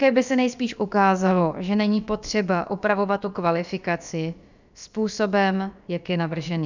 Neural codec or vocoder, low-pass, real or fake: codec, 16 kHz, about 1 kbps, DyCAST, with the encoder's durations; 7.2 kHz; fake